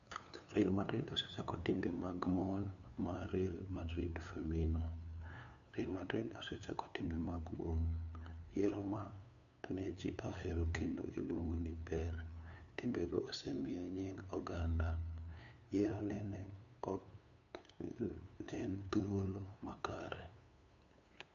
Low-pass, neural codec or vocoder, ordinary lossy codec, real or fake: 7.2 kHz; codec, 16 kHz, 2 kbps, FunCodec, trained on LibriTTS, 25 frames a second; none; fake